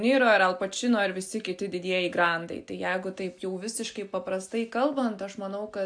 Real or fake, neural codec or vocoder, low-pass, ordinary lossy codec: real; none; 9.9 kHz; AAC, 64 kbps